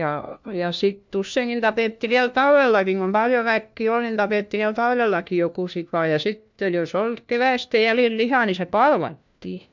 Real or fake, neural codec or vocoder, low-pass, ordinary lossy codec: fake; codec, 16 kHz, 1 kbps, FunCodec, trained on LibriTTS, 50 frames a second; 7.2 kHz; MP3, 64 kbps